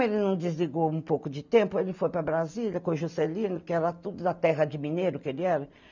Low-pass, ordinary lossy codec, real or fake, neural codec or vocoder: 7.2 kHz; none; real; none